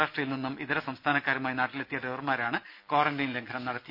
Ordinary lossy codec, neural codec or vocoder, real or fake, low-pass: none; none; real; 5.4 kHz